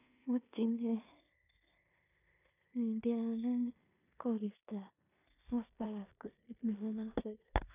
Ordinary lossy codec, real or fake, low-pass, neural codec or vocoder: none; fake; 3.6 kHz; codec, 16 kHz in and 24 kHz out, 0.9 kbps, LongCat-Audio-Codec, four codebook decoder